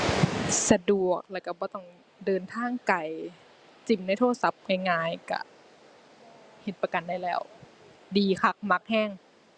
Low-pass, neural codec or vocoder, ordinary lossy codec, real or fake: 9.9 kHz; none; Opus, 64 kbps; real